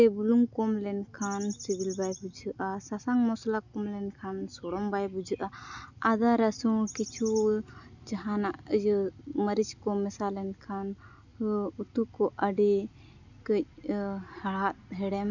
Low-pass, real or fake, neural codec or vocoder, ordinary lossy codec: 7.2 kHz; real; none; none